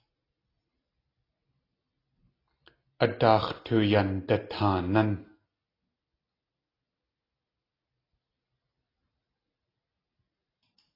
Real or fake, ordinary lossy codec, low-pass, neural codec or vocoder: real; AAC, 24 kbps; 5.4 kHz; none